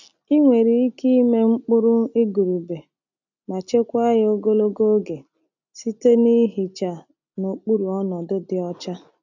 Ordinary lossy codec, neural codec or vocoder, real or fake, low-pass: none; none; real; 7.2 kHz